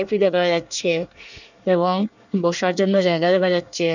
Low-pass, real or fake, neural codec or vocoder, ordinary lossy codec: 7.2 kHz; fake; codec, 24 kHz, 1 kbps, SNAC; none